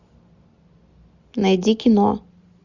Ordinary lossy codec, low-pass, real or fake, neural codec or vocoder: Opus, 64 kbps; 7.2 kHz; real; none